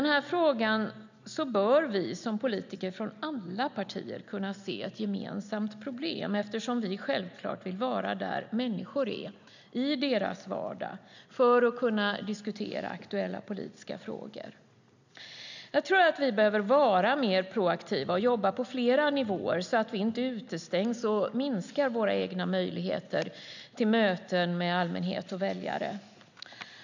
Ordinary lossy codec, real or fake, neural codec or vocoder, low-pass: none; real; none; 7.2 kHz